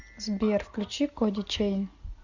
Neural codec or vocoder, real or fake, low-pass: none; real; 7.2 kHz